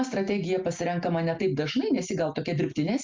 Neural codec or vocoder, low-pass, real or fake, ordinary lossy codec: none; 7.2 kHz; real; Opus, 24 kbps